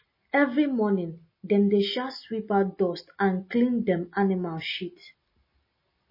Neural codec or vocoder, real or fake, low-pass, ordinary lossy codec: none; real; 5.4 kHz; MP3, 24 kbps